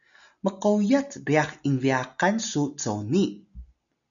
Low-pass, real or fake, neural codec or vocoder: 7.2 kHz; real; none